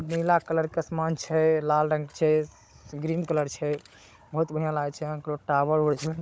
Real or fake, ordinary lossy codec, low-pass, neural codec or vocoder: fake; none; none; codec, 16 kHz, 16 kbps, FunCodec, trained on Chinese and English, 50 frames a second